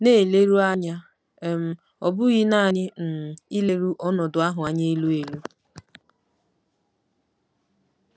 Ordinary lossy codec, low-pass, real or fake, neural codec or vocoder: none; none; real; none